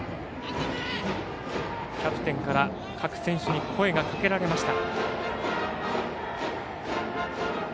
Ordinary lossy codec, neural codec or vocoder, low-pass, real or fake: none; none; none; real